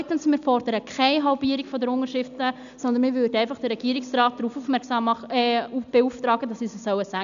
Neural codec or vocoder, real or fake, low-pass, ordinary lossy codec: none; real; 7.2 kHz; none